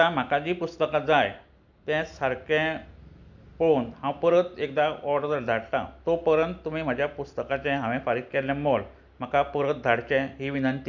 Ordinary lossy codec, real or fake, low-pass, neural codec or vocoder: none; real; 7.2 kHz; none